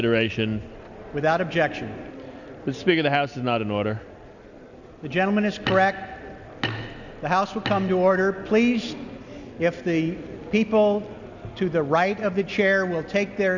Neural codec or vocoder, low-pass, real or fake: none; 7.2 kHz; real